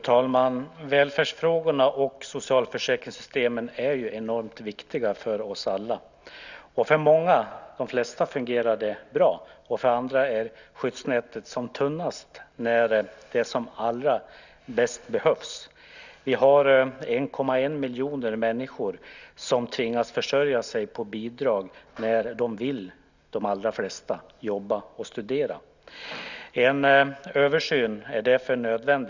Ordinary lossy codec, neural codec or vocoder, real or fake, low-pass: none; none; real; 7.2 kHz